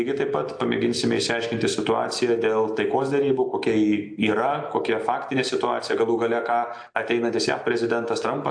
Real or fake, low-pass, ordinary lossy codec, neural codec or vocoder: real; 9.9 kHz; AAC, 64 kbps; none